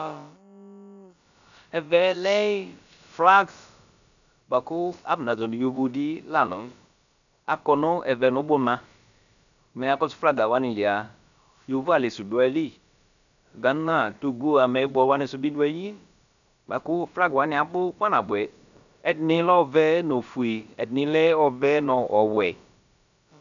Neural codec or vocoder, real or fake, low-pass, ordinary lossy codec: codec, 16 kHz, about 1 kbps, DyCAST, with the encoder's durations; fake; 7.2 kHz; MP3, 96 kbps